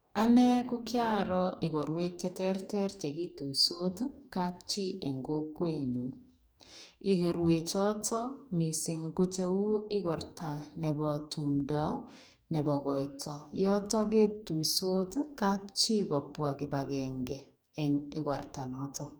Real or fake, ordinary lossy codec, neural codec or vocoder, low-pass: fake; none; codec, 44.1 kHz, 2.6 kbps, DAC; none